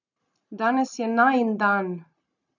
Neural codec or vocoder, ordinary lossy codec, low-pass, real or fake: none; none; 7.2 kHz; real